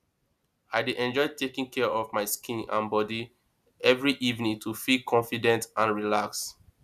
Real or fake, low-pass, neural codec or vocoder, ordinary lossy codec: real; 14.4 kHz; none; none